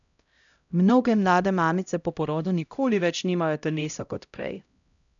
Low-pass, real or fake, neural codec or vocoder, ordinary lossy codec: 7.2 kHz; fake; codec, 16 kHz, 0.5 kbps, X-Codec, HuBERT features, trained on LibriSpeech; none